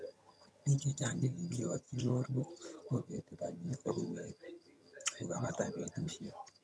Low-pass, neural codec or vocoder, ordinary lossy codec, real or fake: none; vocoder, 22.05 kHz, 80 mel bands, HiFi-GAN; none; fake